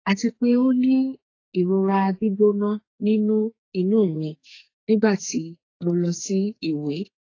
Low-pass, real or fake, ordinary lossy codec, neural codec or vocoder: 7.2 kHz; fake; AAC, 32 kbps; codec, 44.1 kHz, 2.6 kbps, SNAC